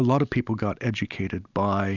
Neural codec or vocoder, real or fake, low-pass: none; real; 7.2 kHz